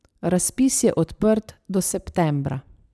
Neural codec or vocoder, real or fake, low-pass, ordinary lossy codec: none; real; none; none